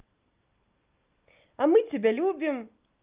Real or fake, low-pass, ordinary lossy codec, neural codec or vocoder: real; 3.6 kHz; Opus, 24 kbps; none